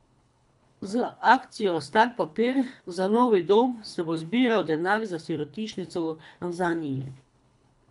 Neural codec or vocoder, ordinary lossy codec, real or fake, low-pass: codec, 24 kHz, 3 kbps, HILCodec; none; fake; 10.8 kHz